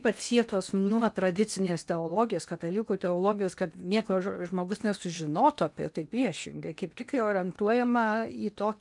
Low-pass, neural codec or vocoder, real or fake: 10.8 kHz; codec, 16 kHz in and 24 kHz out, 0.8 kbps, FocalCodec, streaming, 65536 codes; fake